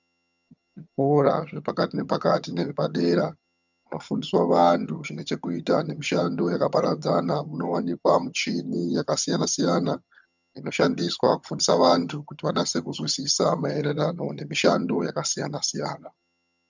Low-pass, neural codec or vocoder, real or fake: 7.2 kHz; vocoder, 22.05 kHz, 80 mel bands, HiFi-GAN; fake